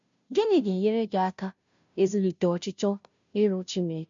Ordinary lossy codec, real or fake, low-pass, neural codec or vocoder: MP3, 64 kbps; fake; 7.2 kHz; codec, 16 kHz, 0.5 kbps, FunCodec, trained on Chinese and English, 25 frames a second